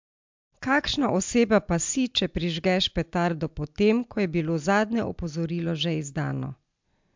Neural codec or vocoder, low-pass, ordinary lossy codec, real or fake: none; 7.2 kHz; MP3, 64 kbps; real